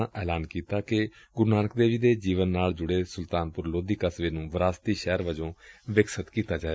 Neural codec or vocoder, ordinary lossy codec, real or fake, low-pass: none; none; real; none